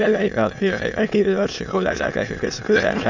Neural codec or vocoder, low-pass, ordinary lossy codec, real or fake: autoencoder, 22.05 kHz, a latent of 192 numbers a frame, VITS, trained on many speakers; 7.2 kHz; none; fake